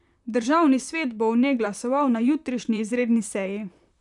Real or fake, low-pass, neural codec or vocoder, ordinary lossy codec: fake; 10.8 kHz; vocoder, 44.1 kHz, 128 mel bands, Pupu-Vocoder; none